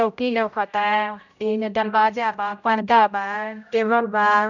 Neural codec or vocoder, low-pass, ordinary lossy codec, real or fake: codec, 16 kHz, 0.5 kbps, X-Codec, HuBERT features, trained on general audio; 7.2 kHz; none; fake